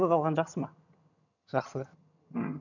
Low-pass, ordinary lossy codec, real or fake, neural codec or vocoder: 7.2 kHz; none; fake; vocoder, 22.05 kHz, 80 mel bands, HiFi-GAN